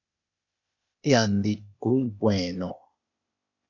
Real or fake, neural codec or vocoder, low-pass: fake; codec, 16 kHz, 0.8 kbps, ZipCodec; 7.2 kHz